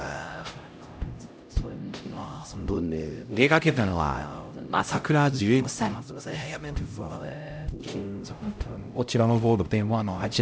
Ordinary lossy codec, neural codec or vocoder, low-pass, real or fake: none; codec, 16 kHz, 0.5 kbps, X-Codec, HuBERT features, trained on LibriSpeech; none; fake